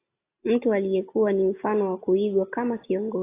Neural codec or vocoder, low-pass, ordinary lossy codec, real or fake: none; 3.6 kHz; AAC, 24 kbps; real